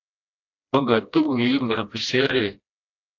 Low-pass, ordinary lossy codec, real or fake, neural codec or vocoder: 7.2 kHz; AAC, 48 kbps; fake; codec, 16 kHz, 1 kbps, FreqCodec, smaller model